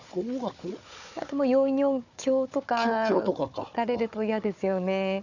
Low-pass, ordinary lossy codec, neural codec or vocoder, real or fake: 7.2 kHz; none; codec, 16 kHz, 4 kbps, FunCodec, trained on Chinese and English, 50 frames a second; fake